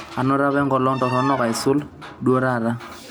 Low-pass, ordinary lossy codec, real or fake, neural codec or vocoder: none; none; real; none